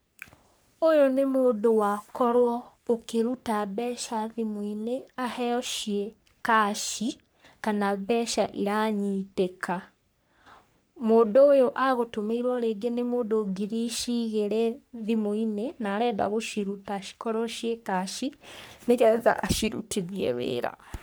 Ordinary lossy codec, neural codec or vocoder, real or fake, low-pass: none; codec, 44.1 kHz, 3.4 kbps, Pupu-Codec; fake; none